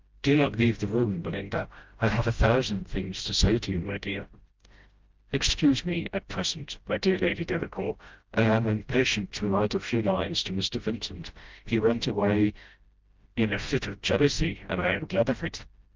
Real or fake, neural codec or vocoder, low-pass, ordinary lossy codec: fake; codec, 16 kHz, 0.5 kbps, FreqCodec, smaller model; 7.2 kHz; Opus, 24 kbps